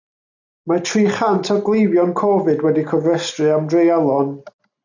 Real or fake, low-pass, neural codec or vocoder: real; 7.2 kHz; none